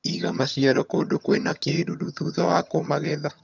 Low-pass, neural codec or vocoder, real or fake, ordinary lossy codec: 7.2 kHz; vocoder, 22.05 kHz, 80 mel bands, HiFi-GAN; fake; none